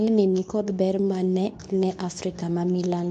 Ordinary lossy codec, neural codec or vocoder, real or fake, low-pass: none; codec, 24 kHz, 0.9 kbps, WavTokenizer, medium speech release version 2; fake; 10.8 kHz